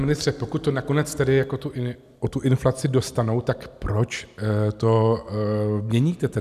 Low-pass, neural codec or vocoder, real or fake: 14.4 kHz; none; real